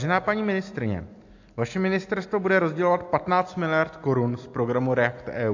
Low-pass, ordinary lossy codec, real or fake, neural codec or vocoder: 7.2 kHz; AAC, 48 kbps; real; none